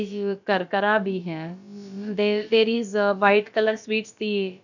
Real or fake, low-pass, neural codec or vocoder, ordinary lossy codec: fake; 7.2 kHz; codec, 16 kHz, about 1 kbps, DyCAST, with the encoder's durations; none